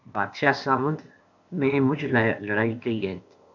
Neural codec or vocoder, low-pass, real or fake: codec, 16 kHz, 0.8 kbps, ZipCodec; 7.2 kHz; fake